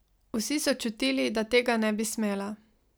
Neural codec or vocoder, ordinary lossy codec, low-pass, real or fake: none; none; none; real